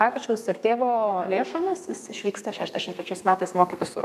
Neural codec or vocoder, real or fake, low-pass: codec, 32 kHz, 1.9 kbps, SNAC; fake; 14.4 kHz